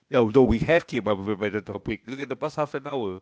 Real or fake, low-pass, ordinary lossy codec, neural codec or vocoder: fake; none; none; codec, 16 kHz, 0.8 kbps, ZipCodec